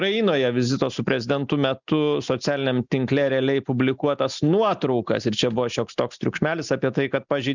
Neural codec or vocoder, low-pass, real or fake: none; 7.2 kHz; real